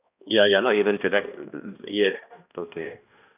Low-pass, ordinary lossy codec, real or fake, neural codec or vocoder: 3.6 kHz; none; fake; codec, 16 kHz, 1 kbps, X-Codec, HuBERT features, trained on balanced general audio